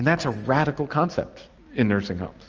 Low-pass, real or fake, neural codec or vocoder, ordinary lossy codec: 7.2 kHz; real; none; Opus, 24 kbps